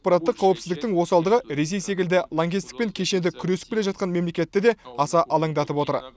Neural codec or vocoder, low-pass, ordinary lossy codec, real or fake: none; none; none; real